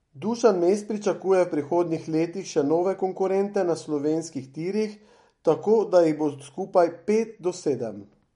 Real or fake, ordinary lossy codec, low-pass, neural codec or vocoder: real; MP3, 48 kbps; 19.8 kHz; none